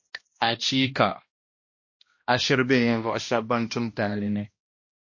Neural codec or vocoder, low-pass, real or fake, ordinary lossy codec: codec, 16 kHz, 1 kbps, X-Codec, HuBERT features, trained on balanced general audio; 7.2 kHz; fake; MP3, 32 kbps